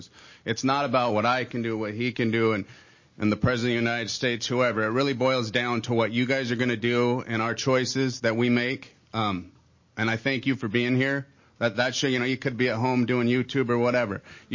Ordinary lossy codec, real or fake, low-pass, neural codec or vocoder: MP3, 32 kbps; real; 7.2 kHz; none